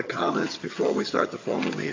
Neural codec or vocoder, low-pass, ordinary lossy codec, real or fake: vocoder, 22.05 kHz, 80 mel bands, HiFi-GAN; 7.2 kHz; AAC, 32 kbps; fake